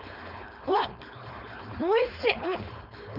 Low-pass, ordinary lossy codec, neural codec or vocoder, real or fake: 5.4 kHz; none; codec, 16 kHz, 4.8 kbps, FACodec; fake